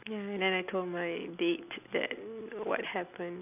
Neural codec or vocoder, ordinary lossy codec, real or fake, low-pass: none; none; real; 3.6 kHz